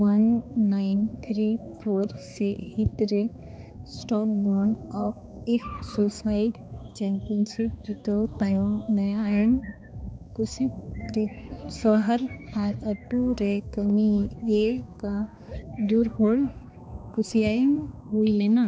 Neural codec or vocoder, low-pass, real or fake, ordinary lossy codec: codec, 16 kHz, 2 kbps, X-Codec, HuBERT features, trained on balanced general audio; none; fake; none